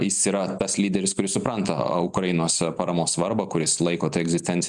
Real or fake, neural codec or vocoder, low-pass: real; none; 10.8 kHz